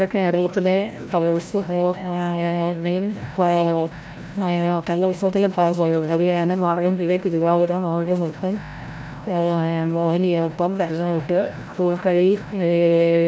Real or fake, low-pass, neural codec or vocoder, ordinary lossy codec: fake; none; codec, 16 kHz, 0.5 kbps, FreqCodec, larger model; none